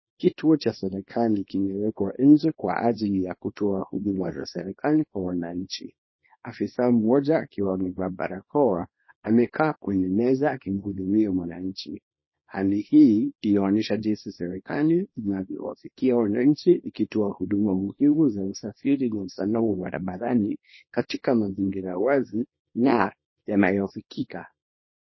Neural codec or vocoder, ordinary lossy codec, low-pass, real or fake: codec, 24 kHz, 0.9 kbps, WavTokenizer, small release; MP3, 24 kbps; 7.2 kHz; fake